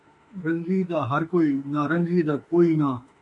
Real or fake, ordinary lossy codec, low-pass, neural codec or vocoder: fake; MP3, 48 kbps; 10.8 kHz; autoencoder, 48 kHz, 32 numbers a frame, DAC-VAE, trained on Japanese speech